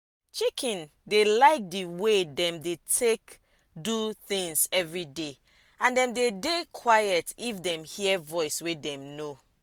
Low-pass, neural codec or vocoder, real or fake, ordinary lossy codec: none; none; real; none